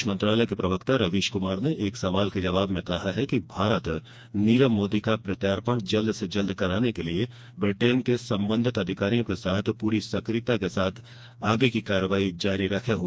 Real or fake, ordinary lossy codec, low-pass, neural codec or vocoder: fake; none; none; codec, 16 kHz, 2 kbps, FreqCodec, smaller model